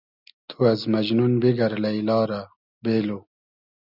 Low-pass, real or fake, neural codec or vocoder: 5.4 kHz; real; none